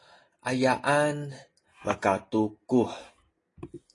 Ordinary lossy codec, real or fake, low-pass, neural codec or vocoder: AAC, 32 kbps; real; 10.8 kHz; none